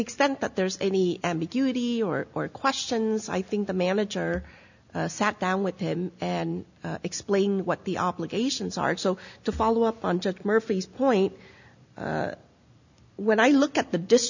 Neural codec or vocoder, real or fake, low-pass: none; real; 7.2 kHz